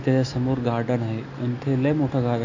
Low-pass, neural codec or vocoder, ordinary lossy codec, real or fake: 7.2 kHz; none; AAC, 48 kbps; real